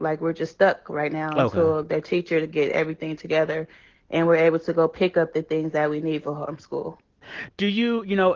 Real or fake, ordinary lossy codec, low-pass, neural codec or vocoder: real; Opus, 16 kbps; 7.2 kHz; none